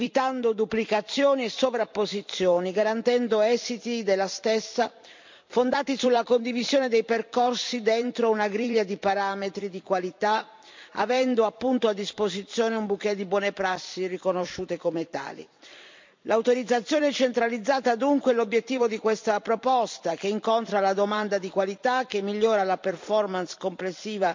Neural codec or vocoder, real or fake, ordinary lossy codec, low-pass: vocoder, 44.1 kHz, 128 mel bands every 256 samples, BigVGAN v2; fake; MP3, 64 kbps; 7.2 kHz